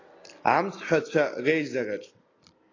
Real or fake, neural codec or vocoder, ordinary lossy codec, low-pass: real; none; AAC, 32 kbps; 7.2 kHz